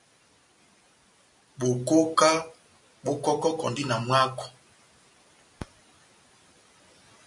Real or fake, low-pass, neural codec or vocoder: real; 10.8 kHz; none